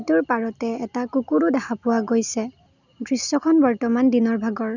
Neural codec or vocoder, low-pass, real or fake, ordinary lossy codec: none; 7.2 kHz; real; none